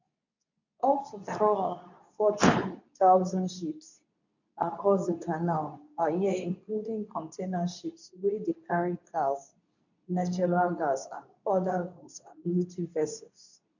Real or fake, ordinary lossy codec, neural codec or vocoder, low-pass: fake; none; codec, 24 kHz, 0.9 kbps, WavTokenizer, medium speech release version 2; 7.2 kHz